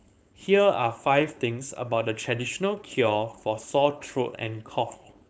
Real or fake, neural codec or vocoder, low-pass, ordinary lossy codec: fake; codec, 16 kHz, 4.8 kbps, FACodec; none; none